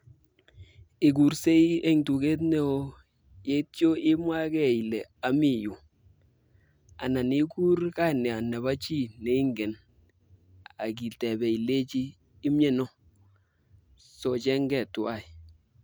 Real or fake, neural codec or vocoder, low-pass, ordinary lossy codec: real; none; none; none